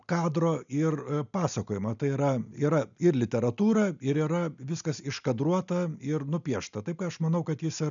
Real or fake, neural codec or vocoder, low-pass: real; none; 7.2 kHz